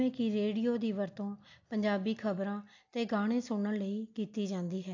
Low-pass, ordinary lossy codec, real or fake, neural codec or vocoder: 7.2 kHz; AAC, 48 kbps; real; none